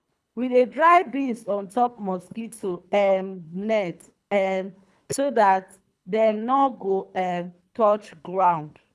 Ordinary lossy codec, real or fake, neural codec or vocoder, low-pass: none; fake; codec, 24 kHz, 3 kbps, HILCodec; none